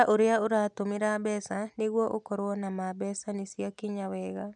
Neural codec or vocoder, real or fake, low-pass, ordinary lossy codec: none; real; 9.9 kHz; none